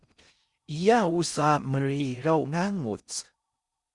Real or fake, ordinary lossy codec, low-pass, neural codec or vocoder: fake; Opus, 64 kbps; 10.8 kHz; codec, 16 kHz in and 24 kHz out, 0.6 kbps, FocalCodec, streaming, 4096 codes